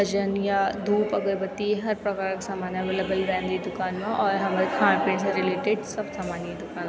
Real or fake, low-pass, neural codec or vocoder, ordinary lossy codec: real; none; none; none